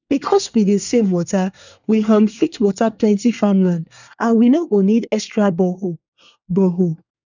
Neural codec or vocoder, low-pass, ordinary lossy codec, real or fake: codec, 24 kHz, 1 kbps, SNAC; 7.2 kHz; none; fake